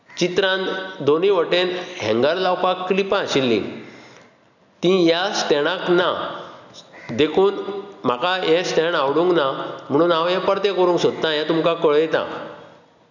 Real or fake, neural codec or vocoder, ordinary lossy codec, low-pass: real; none; none; 7.2 kHz